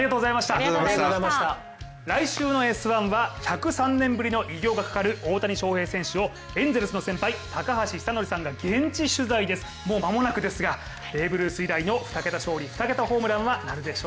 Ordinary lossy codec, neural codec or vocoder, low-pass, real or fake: none; none; none; real